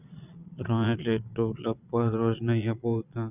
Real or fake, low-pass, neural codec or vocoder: fake; 3.6 kHz; vocoder, 22.05 kHz, 80 mel bands, Vocos